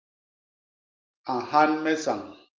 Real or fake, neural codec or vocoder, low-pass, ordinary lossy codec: real; none; 7.2 kHz; Opus, 24 kbps